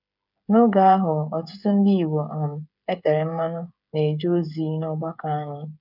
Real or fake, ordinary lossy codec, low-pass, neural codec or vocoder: fake; none; 5.4 kHz; codec, 16 kHz, 8 kbps, FreqCodec, smaller model